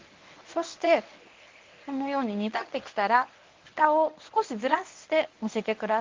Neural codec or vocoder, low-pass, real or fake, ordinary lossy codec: codec, 24 kHz, 0.9 kbps, WavTokenizer, medium speech release version 1; 7.2 kHz; fake; Opus, 32 kbps